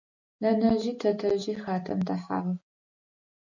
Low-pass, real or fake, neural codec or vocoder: 7.2 kHz; real; none